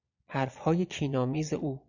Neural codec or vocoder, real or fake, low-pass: vocoder, 22.05 kHz, 80 mel bands, Vocos; fake; 7.2 kHz